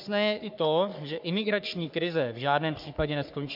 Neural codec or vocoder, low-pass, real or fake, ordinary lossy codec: codec, 44.1 kHz, 3.4 kbps, Pupu-Codec; 5.4 kHz; fake; MP3, 48 kbps